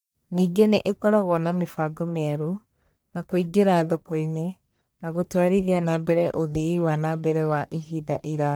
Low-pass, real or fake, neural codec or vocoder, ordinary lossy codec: none; fake; codec, 44.1 kHz, 1.7 kbps, Pupu-Codec; none